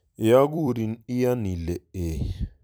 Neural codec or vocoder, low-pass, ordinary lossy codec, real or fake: vocoder, 44.1 kHz, 128 mel bands every 256 samples, BigVGAN v2; none; none; fake